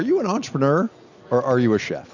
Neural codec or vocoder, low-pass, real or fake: none; 7.2 kHz; real